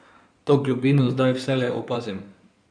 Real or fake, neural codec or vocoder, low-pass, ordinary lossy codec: fake; codec, 16 kHz in and 24 kHz out, 2.2 kbps, FireRedTTS-2 codec; 9.9 kHz; none